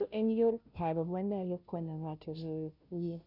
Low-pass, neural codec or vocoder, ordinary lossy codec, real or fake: 5.4 kHz; codec, 16 kHz, 0.5 kbps, FunCodec, trained on Chinese and English, 25 frames a second; none; fake